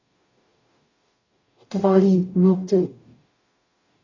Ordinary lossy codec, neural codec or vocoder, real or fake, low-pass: AAC, 32 kbps; codec, 44.1 kHz, 0.9 kbps, DAC; fake; 7.2 kHz